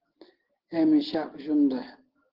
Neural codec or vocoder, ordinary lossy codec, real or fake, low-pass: none; Opus, 16 kbps; real; 5.4 kHz